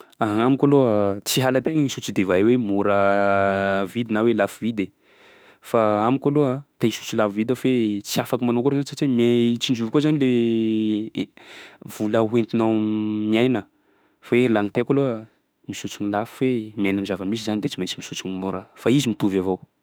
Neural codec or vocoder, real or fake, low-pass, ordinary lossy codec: autoencoder, 48 kHz, 32 numbers a frame, DAC-VAE, trained on Japanese speech; fake; none; none